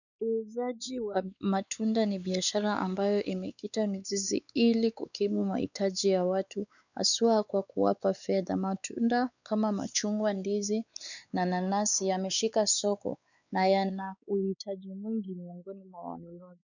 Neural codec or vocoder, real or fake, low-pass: codec, 16 kHz, 4 kbps, X-Codec, WavLM features, trained on Multilingual LibriSpeech; fake; 7.2 kHz